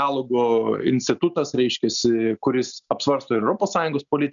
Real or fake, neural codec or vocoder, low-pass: real; none; 7.2 kHz